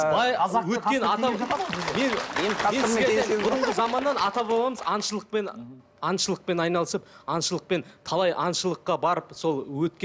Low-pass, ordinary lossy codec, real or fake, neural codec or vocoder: none; none; real; none